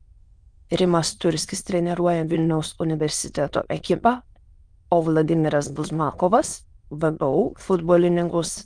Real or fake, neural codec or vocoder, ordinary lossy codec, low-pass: fake; autoencoder, 22.05 kHz, a latent of 192 numbers a frame, VITS, trained on many speakers; Opus, 32 kbps; 9.9 kHz